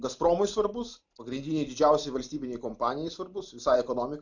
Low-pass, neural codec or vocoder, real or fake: 7.2 kHz; none; real